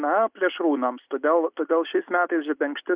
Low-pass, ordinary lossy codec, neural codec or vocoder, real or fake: 3.6 kHz; Opus, 64 kbps; none; real